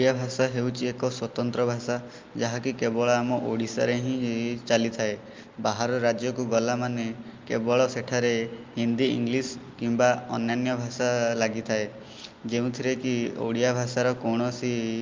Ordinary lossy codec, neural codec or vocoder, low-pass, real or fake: Opus, 24 kbps; none; 7.2 kHz; real